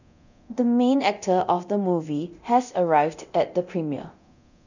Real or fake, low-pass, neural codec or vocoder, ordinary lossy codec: fake; 7.2 kHz; codec, 24 kHz, 0.9 kbps, DualCodec; none